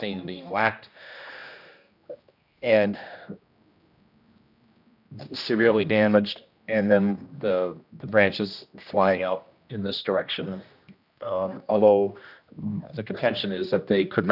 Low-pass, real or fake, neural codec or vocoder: 5.4 kHz; fake; codec, 16 kHz, 1 kbps, X-Codec, HuBERT features, trained on general audio